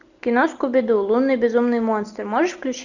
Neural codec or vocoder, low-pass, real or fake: none; 7.2 kHz; real